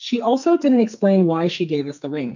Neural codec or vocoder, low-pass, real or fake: codec, 44.1 kHz, 2.6 kbps, SNAC; 7.2 kHz; fake